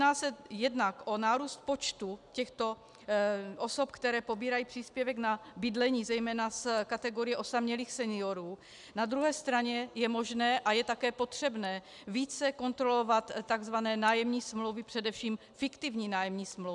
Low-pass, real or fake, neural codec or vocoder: 10.8 kHz; real; none